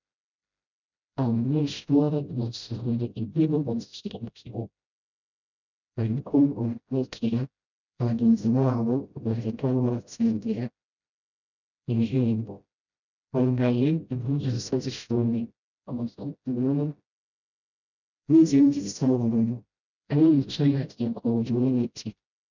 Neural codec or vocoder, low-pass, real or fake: codec, 16 kHz, 0.5 kbps, FreqCodec, smaller model; 7.2 kHz; fake